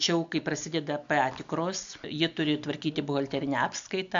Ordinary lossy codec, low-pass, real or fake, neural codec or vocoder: AAC, 64 kbps; 7.2 kHz; real; none